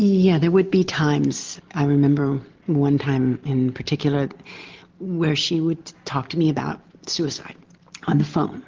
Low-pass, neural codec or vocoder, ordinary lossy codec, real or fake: 7.2 kHz; none; Opus, 16 kbps; real